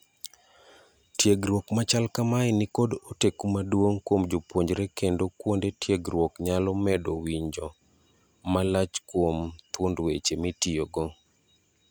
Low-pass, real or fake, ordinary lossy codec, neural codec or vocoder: none; real; none; none